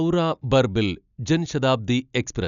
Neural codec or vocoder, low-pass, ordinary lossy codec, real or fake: none; 7.2 kHz; none; real